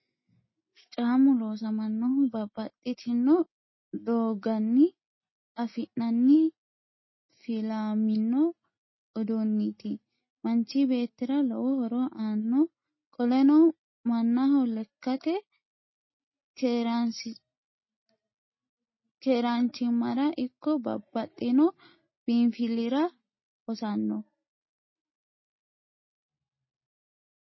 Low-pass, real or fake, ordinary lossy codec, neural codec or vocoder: 7.2 kHz; real; MP3, 24 kbps; none